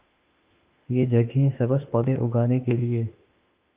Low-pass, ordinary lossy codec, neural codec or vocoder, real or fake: 3.6 kHz; Opus, 24 kbps; autoencoder, 48 kHz, 32 numbers a frame, DAC-VAE, trained on Japanese speech; fake